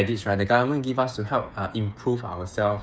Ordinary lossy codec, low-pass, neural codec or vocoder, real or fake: none; none; codec, 16 kHz, 8 kbps, FreqCodec, smaller model; fake